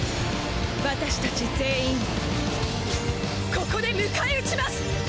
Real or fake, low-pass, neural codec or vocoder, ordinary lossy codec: real; none; none; none